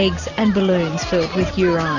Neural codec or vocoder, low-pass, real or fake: none; 7.2 kHz; real